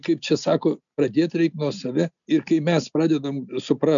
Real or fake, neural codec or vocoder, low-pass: real; none; 7.2 kHz